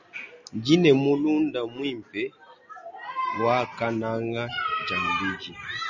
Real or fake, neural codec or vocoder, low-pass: real; none; 7.2 kHz